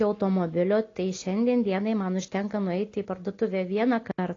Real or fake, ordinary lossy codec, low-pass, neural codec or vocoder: real; AAC, 32 kbps; 7.2 kHz; none